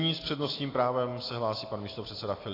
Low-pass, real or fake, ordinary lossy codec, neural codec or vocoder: 5.4 kHz; real; AAC, 24 kbps; none